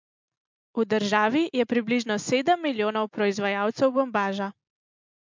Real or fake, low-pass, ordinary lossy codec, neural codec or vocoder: real; 7.2 kHz; MP3, 64 kbps; none